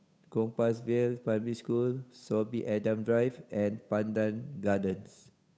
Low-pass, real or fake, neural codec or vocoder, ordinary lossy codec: none; fake; codec, 16 kHz, 8 kbps, FunCodec, trained on Chinese and English, 25 frames a second; none